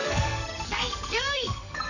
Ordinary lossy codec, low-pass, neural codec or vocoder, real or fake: none; 7.2 kHz; codec, 44.1 kHz, 2.6 kbps, SNAC; fake